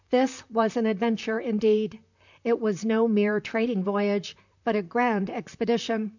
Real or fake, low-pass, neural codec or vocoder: fake; 7.2 kHz; vocoder, 44.1 kHz, 128 mel bands, Pupu-Vocoder